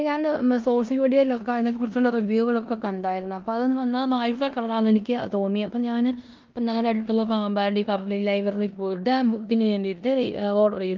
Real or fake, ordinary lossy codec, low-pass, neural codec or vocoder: fake; Opus, 32 kbps; 7.2 kHz; codec, 16 kHz in and 24 kHz out, 0.9 kbps, LongCat-Audio-Codec, four codebook decoder